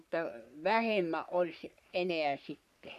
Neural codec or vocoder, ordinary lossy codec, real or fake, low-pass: codec, 44.1 kHz, 3.4 kbps, Pupu-Codec; none; fake; 14.4 kHz